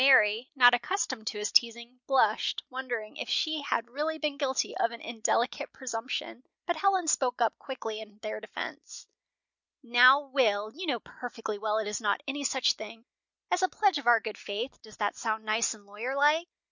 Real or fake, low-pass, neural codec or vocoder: real; 7.2 kHz; none